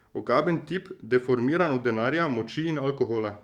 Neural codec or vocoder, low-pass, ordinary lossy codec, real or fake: codec, 44.1 kHz, 7.8 kbps, DAC; 19.8 kHz; Opus, 64 kbps; fake